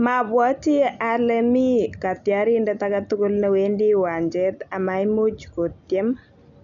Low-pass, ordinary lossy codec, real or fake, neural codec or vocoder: 7.2 kHz; none; real; none